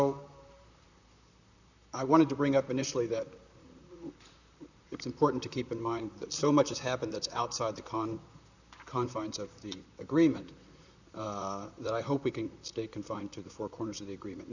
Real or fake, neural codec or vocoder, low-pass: fake; vocoder, 44.1 kHz, 128 mel bands, Pupu-Vocoder; 7.2 kHz